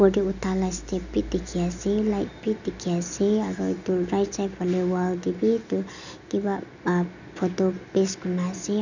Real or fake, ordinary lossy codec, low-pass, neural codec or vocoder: real; none; 7.2 kHz; none